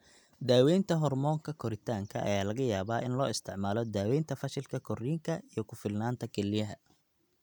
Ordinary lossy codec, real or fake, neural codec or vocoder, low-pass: none; real; none; 19.8 kHz